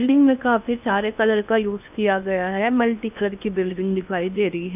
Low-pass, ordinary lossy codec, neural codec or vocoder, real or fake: 3.6 kHz; none; codec, 16 kHz in and 24 kHz out, 0.8 kbps, FocalCodec, streaming, 65536 codes; fake